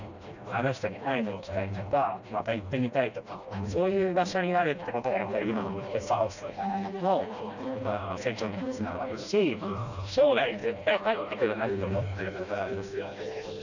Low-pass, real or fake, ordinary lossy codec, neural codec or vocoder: 7.2 kHz; fake; none; codec, 16 kHz, 1 kbps, FreqCodec, smaller model